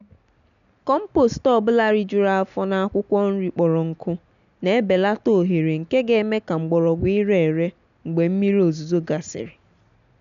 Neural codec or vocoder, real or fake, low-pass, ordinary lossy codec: none; real; 7.2 kHz; MP3, 96 kbps